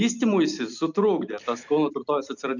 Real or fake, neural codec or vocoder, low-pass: real; none; 7.2 kHz